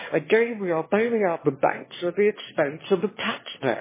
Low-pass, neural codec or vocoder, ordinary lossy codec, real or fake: 3.6 kHz; autoencoder, 22.05 kHz, a latent of 192 numbers a frame, VITS, trained on one speaker; MP3, 16 kbps; fake